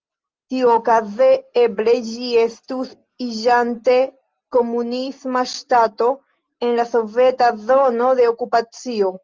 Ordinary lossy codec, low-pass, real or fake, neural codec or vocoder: Opus, 24 kbps; 7.2 kHz; real; none